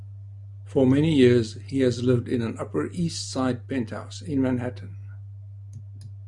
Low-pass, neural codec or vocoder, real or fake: 10.8 kHz; none; real